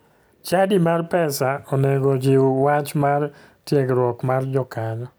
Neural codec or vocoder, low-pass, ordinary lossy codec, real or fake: none; none; none; real